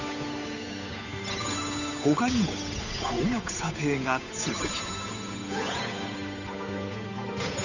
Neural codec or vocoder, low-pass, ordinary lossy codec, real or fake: codec, 16 kHz, 8 kbps, FunCodec, trained on Chinese and English, 25 frames a second; 7.2 kHz; none; fake